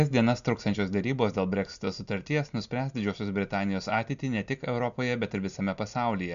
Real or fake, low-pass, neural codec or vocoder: real; 7.2 kHz; none